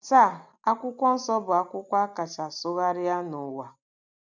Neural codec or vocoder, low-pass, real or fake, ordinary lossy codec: none; 7.2 kHz; real; none